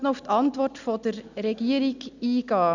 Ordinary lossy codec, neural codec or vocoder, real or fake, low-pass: none; none; real; 7.2 kHz